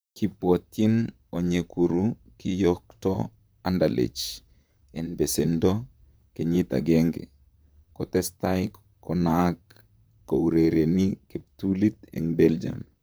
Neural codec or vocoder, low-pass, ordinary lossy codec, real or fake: vocoder, 44.1 kHz, 128 mel bands, Pupu-Vocoder; none; none; fake